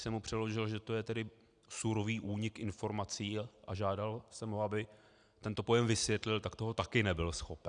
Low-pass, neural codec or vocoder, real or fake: 9.9 kHz; none; real